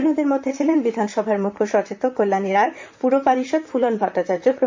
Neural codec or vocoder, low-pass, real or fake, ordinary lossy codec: vocoder, 44.1 kHz, 128 mel bands, Pupu-Vocoder; 7.2 kHz; fake; MP3, 48 kbps